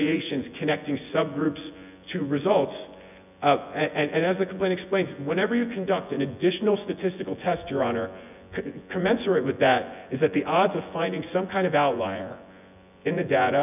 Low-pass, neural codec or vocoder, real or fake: 3.6 kHz; vocoder, 24 kHz, 100 mel bands, Vocos; fake